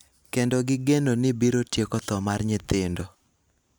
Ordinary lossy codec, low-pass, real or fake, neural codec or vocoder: none; none; real; none